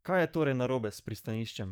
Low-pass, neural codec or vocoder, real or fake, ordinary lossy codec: none; codec, 44.1 kHz, 7.8 kbps, DAC; fake; none